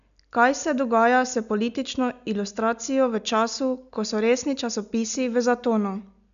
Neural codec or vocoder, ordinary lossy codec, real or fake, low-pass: none; none; real; 7.2 kHz